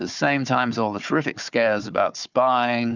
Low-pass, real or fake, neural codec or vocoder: 7.2 kHz; fake; codec, 16 kHz, 4 kbps, FunCodec, trained on Chinese and English, 50 frames a second